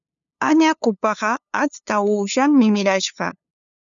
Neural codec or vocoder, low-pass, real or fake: codec, 16 kHz, 2 kbps, FunCodec, trained on LibriTTS, 25 frames a second; 7.2 kHz; fake